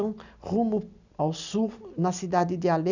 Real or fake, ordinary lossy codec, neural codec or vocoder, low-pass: real; none; none; 7.2 kHz